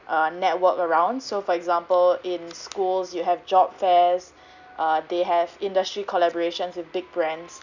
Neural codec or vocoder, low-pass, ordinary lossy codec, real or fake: none; 7.2 kHz; none; real